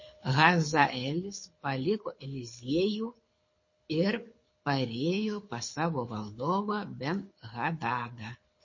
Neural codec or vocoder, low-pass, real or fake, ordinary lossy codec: codec, 24 kHz, 6 kbps, HILCodec; 7.2 kHz; fake; MP3, 32 kbps